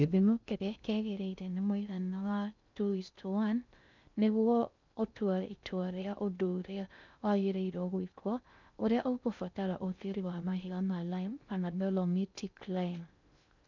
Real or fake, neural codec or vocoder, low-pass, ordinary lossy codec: fake; codec, 16 kHz in and 24 kHz out, 0.6 kbps, FocalCodec, streaming, 2048 codes; 7.2 kHz; none